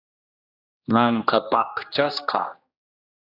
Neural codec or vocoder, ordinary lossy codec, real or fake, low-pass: codec, 16 kHz, 1 kbps, X-Codec, HuBERT features, trained on general audio; AAC, 48 kbps; fake; 5.4 kHz